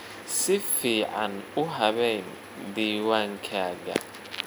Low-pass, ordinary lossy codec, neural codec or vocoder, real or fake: none; none; none; real